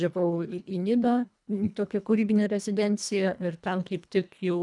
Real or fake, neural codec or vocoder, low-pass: fake; codec, 24 kHz, 1.5 kbps, HILCodec; 10.8 kHz